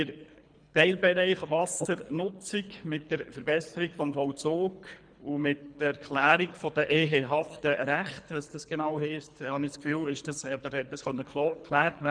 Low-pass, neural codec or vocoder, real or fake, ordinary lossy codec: 9.9 kHz; codec, 24 kHz, 3 kbps, HILCodec; fake; MP3, 96 kbps